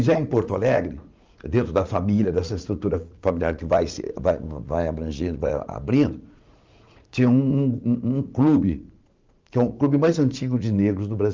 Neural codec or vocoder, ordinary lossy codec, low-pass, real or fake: vocoder, 44.1 kHz, 80 mel bands, Vocos; Opus, 24 kbps; 7.2 kHz; fake